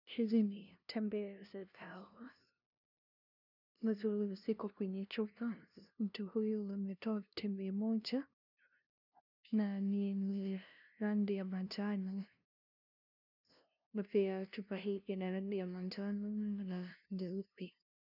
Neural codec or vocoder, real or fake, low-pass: codec, 16 kHz, 0.5 kbps, FunCodec, trained on LibriTTS, 25 frames a second; fake; 5.4 kHz